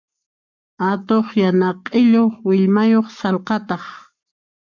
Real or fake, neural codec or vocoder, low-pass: fake; codec, 44.1 kHz, 7.8 kbps, Pupu-Codec; 7.2 kHz